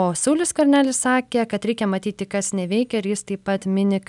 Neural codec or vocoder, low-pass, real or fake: none; 10.8 kHz; real